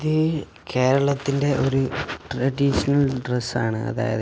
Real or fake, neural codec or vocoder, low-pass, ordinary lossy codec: real; none; none; none